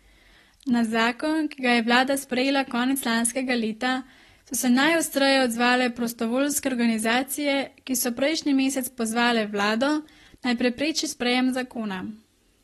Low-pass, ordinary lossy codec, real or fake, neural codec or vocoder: 19.8 kHz; AAC, 32 kbps; real; none